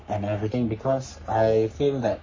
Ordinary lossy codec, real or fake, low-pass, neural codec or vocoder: MP3, 32 kbps; fake; 7.2 kHz; codec, 44.1 kHz, 3.4 kbps, Pupu-Codec